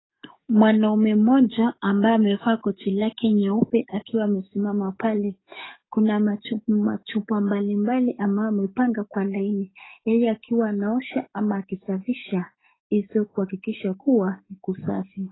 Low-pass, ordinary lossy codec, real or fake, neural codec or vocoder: 7.2 kHz; AAC, 16 kbps; fake; codec, 44.1 kHz, 7.8 kbps, DAC